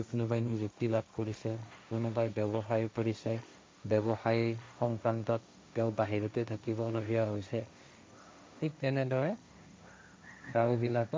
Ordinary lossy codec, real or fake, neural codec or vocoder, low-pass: none; fake; codec, 16 kHz, 1.1 kbps, Voila-Tokenizer; none